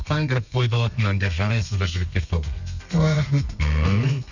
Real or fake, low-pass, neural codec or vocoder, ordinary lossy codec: fake; 7.2 kHz; codec, 32 kHz, 1.9 kbps, SNAC; none